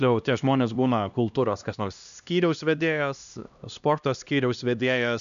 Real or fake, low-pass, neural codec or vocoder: fake; 7.2 kHz; codec, 16 kHz, 1 kbps, X-Codec, HuBERT features, trained on LibriSpeech